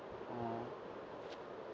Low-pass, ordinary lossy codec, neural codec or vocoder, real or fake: none; none; none; real